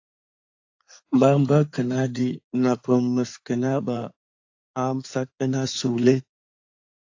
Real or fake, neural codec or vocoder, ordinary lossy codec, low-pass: fake; codec, 16 kHz in and 24 kHz out, 2.2 kbps, FireRedTTS-2 codec; AAC, 48 kbps; 7.2 kHz